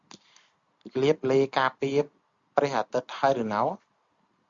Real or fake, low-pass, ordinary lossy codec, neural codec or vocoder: real; 7.2 kHz; Opus, 64 kbps; none